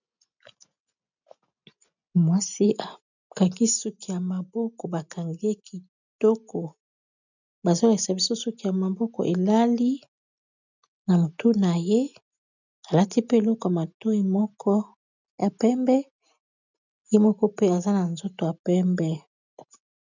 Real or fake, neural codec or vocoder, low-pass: real; none; 7.2 kHz